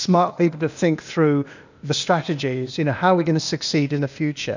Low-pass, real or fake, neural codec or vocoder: 7.2 kHz; fake; codec, 16 kHz, 0.8 kbps, ZipCodec